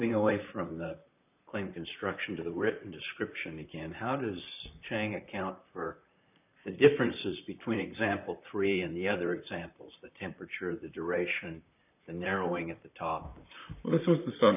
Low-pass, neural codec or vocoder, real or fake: 3.6 kHz; vocoder, 44.1 kHz, 128 mel bands, Pupu-Vocoder; fake